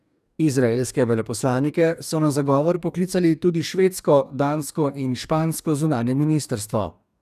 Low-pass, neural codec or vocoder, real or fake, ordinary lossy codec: 14.4 kHz; codec, 44.1 kHz, 2.6 kbps, DAC; fake; none